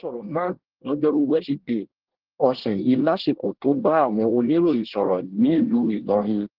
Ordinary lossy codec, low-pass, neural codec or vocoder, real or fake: Opus, 16 kbps; 5.4 kHz; codec, 24 kHz, 1 kbps, SNAC; fake